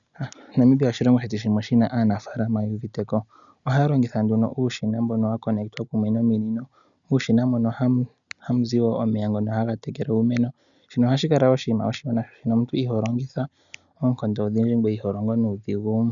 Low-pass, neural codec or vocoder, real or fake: 7.2 kHz; none; real